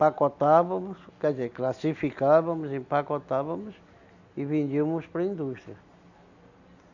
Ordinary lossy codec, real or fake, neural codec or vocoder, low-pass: none; real; none; 7.2 kHz